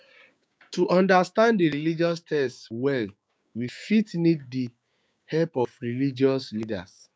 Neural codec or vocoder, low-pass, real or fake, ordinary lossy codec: codec, 16 kHz, 6 kbps, DAC; none; fake; none